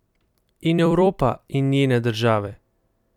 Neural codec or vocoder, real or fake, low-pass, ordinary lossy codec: vocoder, 44.1 kHz, 128 mel bands every 256 samples, BigVGAN v2; fake; 19.8 kHz; none